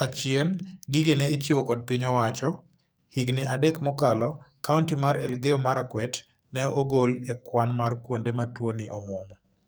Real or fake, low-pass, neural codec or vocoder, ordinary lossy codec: fake; none; codec, 44.1 kHz, 2.6 kbps, SNAC; none